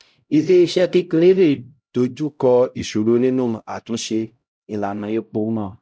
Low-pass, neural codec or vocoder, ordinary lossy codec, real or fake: none; codec, 16 kHz, 0.5 kbps, X-Codec, HuBERT features, trained on LibriSpeech; none; fake